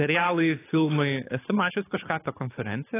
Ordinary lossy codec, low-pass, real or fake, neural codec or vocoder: AAC, 16 kbps; 3.6 kHz; fake; codec, 24 kHz, 6 kbps, HILCodec